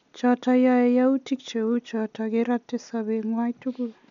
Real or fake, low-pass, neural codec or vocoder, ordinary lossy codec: real; 7.2 kHz; none; none